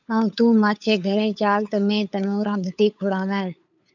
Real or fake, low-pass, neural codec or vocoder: fake; 7.2 kHz; codec, 16 kHz, 8 kbps, FunCodec, trained on LibriTTS, 25 frames a second